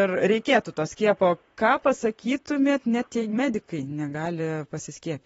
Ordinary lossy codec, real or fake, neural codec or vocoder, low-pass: AAC, 24 kbps; fake; vocoder, 44.1 kHz, 128 mel bands, Pupu-Vocoder; 19.8 kHz